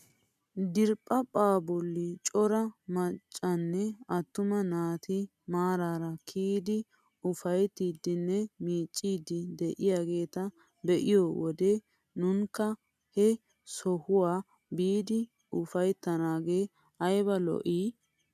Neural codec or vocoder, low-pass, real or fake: none; 14.4 kHz; real